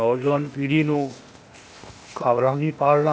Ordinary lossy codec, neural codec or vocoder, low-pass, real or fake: none; codec, 16 kHz, 0.8 kbps, ZipCodec; none; fake